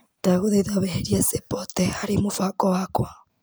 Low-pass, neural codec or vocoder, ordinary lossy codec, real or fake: none; none; none; real